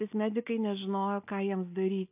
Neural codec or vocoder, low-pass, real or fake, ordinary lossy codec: none; 3.6 kHz; real; AAC, 32 kbps